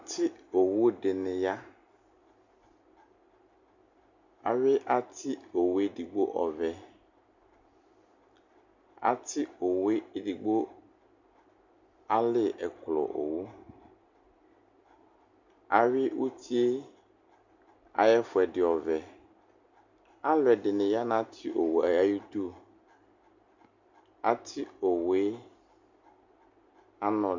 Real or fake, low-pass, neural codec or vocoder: real; 7.2 kHz; none